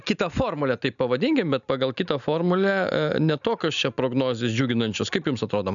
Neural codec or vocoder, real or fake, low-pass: none; real; 7.2 kHz